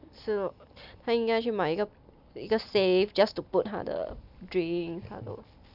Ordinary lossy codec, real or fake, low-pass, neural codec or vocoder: none; real; 5.4 kHz; none